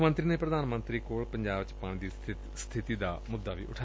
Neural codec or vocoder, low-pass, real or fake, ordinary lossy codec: none; none; real; none